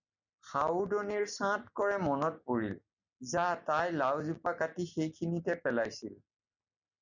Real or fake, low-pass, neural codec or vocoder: real; 7.2 kHz; none